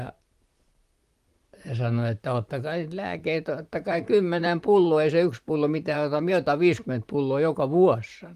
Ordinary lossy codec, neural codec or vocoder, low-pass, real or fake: Opus, 24 kbps; vocoder, 44.1 kHz, 128 mel bands, Pupu-Vocoder; 14.4 kHz; fake